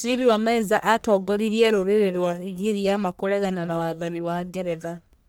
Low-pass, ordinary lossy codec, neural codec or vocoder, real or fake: none; none; codec, 44.1 kHz, 1.7 kbps, Pupu-Codec; fake